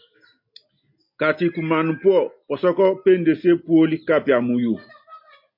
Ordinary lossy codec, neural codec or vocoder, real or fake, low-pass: MP3, 32 kbps; none; real; 5.4 kHz